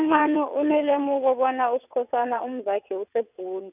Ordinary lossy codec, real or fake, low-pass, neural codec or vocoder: none; fake; 3.6 kHz; vocoder, 22.05 kHz, 80 mel bands, WaveNeXt